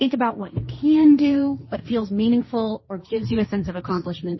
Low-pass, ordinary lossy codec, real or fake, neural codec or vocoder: 7.2 kHz; MP3, 24 kbps; fake; codec, 16 kHz, 1.1 kbps, Voila-Tokenizer